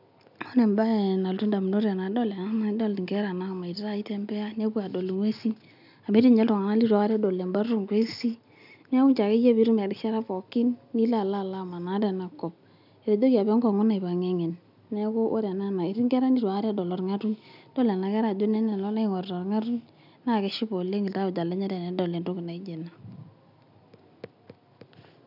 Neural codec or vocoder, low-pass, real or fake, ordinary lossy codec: none; 5.4 kHz; real; none